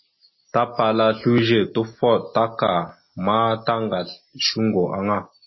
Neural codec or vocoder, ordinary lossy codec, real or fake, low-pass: none; MP3, 24 kbps; real; 7.2 kHz